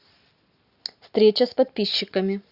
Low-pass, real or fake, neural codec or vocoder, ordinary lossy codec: 5.4 kHz; real; none; Opus, 64 kbps